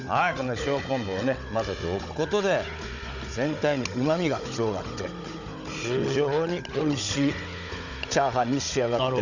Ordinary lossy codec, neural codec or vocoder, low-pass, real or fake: none; codec, 16 kHz, 16 kbps, FunCodec, trained on Chinese and English, 50 frames a second; 7.2 kHz; fake